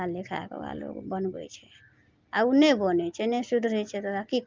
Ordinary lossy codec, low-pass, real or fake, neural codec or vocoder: Opus, 24 kbps; 7.2 kHz; real; none